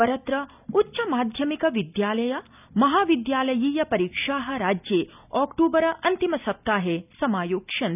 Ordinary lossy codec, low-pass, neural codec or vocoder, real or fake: none; 3.6 kHz; none; real